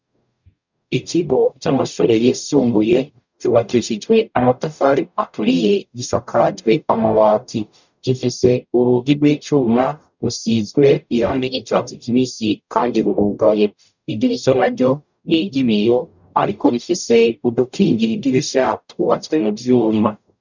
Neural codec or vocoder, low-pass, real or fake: codec, 44.1 kHz, 0.9 kbps, DAC; 7.2 kHz; fake